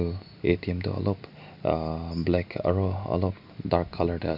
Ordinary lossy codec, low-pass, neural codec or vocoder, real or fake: none; 5.4 kHz; none; real